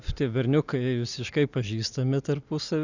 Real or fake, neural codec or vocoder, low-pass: real; none; 7.2 kHz